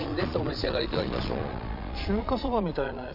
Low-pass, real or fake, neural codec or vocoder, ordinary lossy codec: 5.4 kHz; fake; vocoder, 22.05 kHz, 80 mel bands, Vocos; none